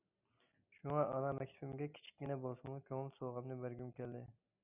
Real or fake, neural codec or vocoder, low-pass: real; none; 3.6 kHz